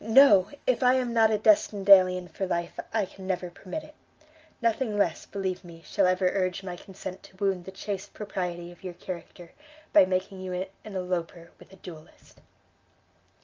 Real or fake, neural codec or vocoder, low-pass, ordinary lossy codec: real; none; 7.2 kHz; Opus, 24 kbps